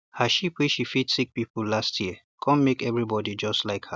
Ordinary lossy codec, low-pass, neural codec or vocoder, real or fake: none; none; none; real